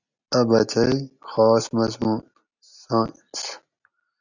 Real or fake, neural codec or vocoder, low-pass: real; none; 7.2 kHz